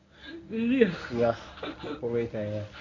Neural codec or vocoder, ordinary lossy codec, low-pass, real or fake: codec, 24 kHz, 0.9 kbps, WavTokenizer, medium speech release version 1; none; 7.2 kHz; fake